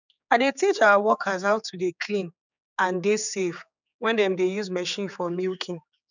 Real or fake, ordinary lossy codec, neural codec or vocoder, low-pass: fake; none; codec, 16 kHz, 4 kbps, X-Codec, HuBERT features, trained on general audio; 7.2 kHz